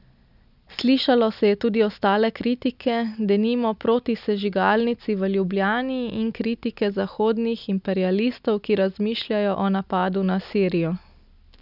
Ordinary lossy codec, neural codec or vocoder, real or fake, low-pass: none; none; real; 5.4 kHz